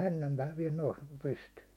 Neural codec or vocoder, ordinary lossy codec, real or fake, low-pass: autoencoder, 48 kHz, 128 numbers a frame, DAC-VAE, trained on Japanese speech; MP3, 64 kbps; fake; 19.8 kHz